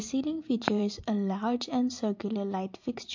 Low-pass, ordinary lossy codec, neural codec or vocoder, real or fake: 7.2 kHz; MP3, 48 kbps; vocoder, 44.1 kHz, 128 mel bands every 512 samples, BigVGAN v2; fake